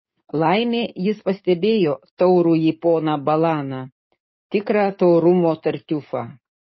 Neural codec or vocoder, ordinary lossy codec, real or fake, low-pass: codec, 44.1 kHz, 7.8 kbps, DAC; MP3, 24 kbps; fake; 7.2 kHz